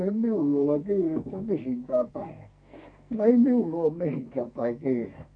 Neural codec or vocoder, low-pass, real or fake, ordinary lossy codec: codec, 44.1 kHz, 2.6 kbps, SNAC; 9.9 kHz; fake; none